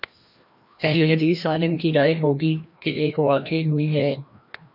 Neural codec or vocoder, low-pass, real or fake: codec, 16 kHz, 1 kbps, FreqCodec, larger model; 5.4 kHz; fake